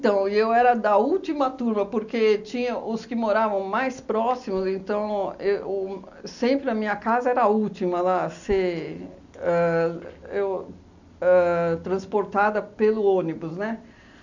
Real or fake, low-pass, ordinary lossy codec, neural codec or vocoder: real; 7.2 kHz; none; none